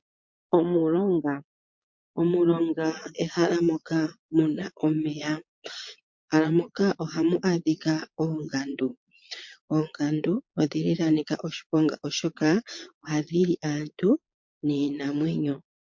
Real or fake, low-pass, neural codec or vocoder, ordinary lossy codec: fake; 7.2 kHz; vocoder, 22.05 kHz, 80 mel bands, Vocos; MP3, 48 kbps